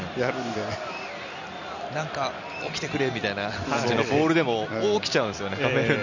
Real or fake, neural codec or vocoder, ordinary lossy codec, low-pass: real; none; none; 7.2 kHz